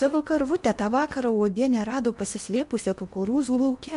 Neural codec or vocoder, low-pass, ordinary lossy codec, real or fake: codec, 16 kHz in and 24 kHz out, 0.8 kbps, FocalCodec, streaming, 65536 codes; 10.8 kHz; MP3, 64 kbps; fake